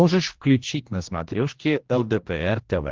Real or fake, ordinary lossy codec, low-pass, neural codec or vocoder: fake; Opus, 16 kbps; 7.2 kHz; codec, 16 kHz, 1 kbps, X-Codec, HuBERT features, trained on general audio